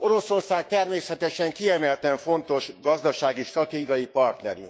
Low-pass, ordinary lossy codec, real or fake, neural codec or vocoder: none; none; fake; codec, 16 kHz, 6 kbps, DAC